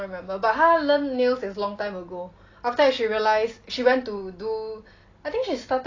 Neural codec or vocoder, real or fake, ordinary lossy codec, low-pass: none; real; AAC, 32 kbps; 7.2 kHz